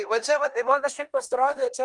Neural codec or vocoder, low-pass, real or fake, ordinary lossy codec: codec, 16 kHz in and 24 kHz out, 0.9 kbps, LongCat-Audio-Codec, four codebook decoder; 10.8 kHz; fake; Opus, 32 kbps